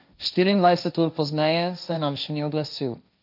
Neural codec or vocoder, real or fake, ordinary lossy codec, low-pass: codec, 16 kHz, 1.1 kbps, Voila-Tokenizer; fake; none; 5.4 kHz